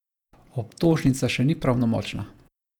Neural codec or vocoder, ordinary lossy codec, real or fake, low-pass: vocoder, 48 kHz, 128 mel bands, Vocos; none; fake; 19.8 kHz